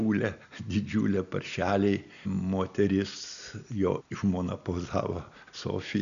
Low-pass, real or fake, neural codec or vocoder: 7.2 kHz; real; none